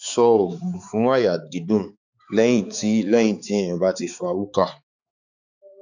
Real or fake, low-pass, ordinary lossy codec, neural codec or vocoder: fake; 7.2 kHz; none; codec, 16 kHz, 4 kbps, X-Codec, HuBERT features, trained on balanced general audio